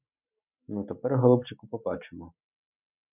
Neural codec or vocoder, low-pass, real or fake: none; 3.6 kHz; real